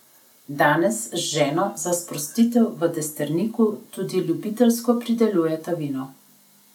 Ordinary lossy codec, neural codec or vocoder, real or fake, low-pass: none; none; real; 19.8 kHz